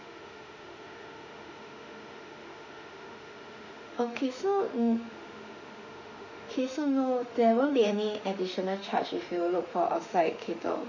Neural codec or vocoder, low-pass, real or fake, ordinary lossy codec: autoencoder, 48 kHz, 32 numbers a frame, DAC-VAE, trained on Japanese speech; 7.2 kHz; fake; none